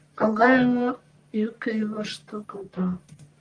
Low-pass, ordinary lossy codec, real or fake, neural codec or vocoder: 9.9 kHz; Opus, 32 kbps; fake; codec, 44.1 kHz, 1.7 kbps, Pupu-Codec